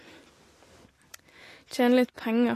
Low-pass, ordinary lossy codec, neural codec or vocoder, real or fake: 14.4 kHz; AAC, 48 kbps; none; real